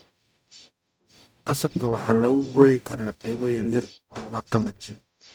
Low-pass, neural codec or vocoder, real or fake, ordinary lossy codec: none; codec, 44.1 kHz, 0.9 kbps, DAC; fake; none